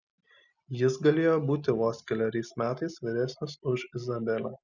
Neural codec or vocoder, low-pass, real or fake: none; 7.2 kHz; real